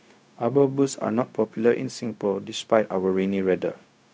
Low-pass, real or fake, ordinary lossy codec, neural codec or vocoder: none; fake; none; codec, 16 kHz, 0.4 kbps, LongCat-Audio-Codec